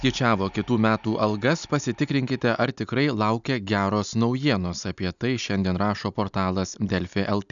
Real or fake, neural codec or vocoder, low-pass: real; none; 7.2 kHz